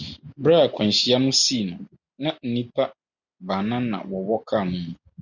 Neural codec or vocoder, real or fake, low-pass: none; real; 7.2 kHz